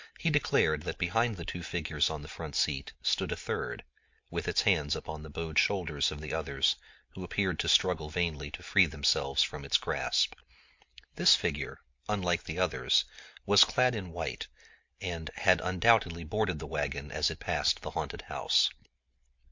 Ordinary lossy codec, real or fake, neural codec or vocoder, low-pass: MP3, 48 kbps; real; none; 7.2 kHz